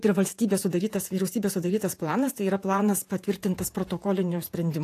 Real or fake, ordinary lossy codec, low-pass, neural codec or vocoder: fake; AAC, 64 kbps; 14.4 kHz; vocoder, 48 kHz, 128 mel bands, Vocos